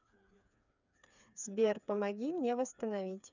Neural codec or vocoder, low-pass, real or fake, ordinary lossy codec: codec, 16 kHz, 4 kbps, FreqCodec, smaller model; 7.2 kHz; fake; none